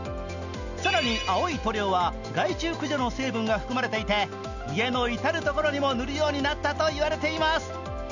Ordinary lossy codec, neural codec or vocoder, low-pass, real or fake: none; none; 7.2 kHz; real